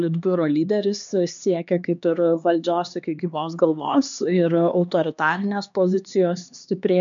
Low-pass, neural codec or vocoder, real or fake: 7.2 kHz; codec, 16 kHz, 2 kbps, X-Codec, HuBERT features, trained on LibriSpeech; fake